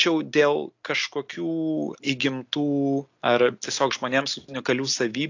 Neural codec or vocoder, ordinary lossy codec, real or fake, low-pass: none; AAC, 48 kbps; real; 7.2 kHz